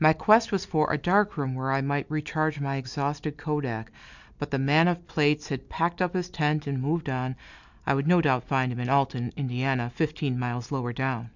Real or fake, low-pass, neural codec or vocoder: real; 7.2 kHz; none